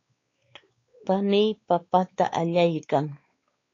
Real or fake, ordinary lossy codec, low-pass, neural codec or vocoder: fake; AAC, 32 kbps; 7.2 kHz; codec, 16 kHz, 4 kbps, X-Codec, WavLM features, trained on Multilingual LibriSpeech